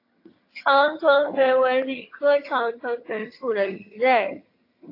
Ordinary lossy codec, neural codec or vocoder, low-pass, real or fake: AAC, 32 kbps; vocoder, 22.05 kHz, 80 mel bands, HiFi-GAN; 5.4 kHz; fake